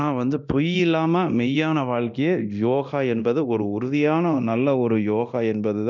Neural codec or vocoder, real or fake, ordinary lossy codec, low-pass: codec, 24 kHz, 0.9 kbps, DualCodec; fake; none; 7.2 kHz